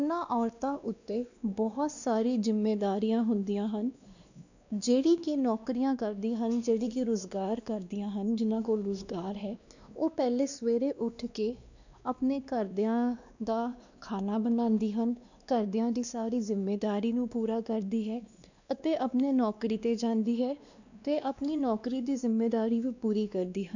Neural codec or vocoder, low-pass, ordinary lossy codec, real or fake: codec, 16 kHz, 2 kbps, X-Codec, WavLM features, trained on Multilingual LibriSpeech; 7.2 kHz; none; fake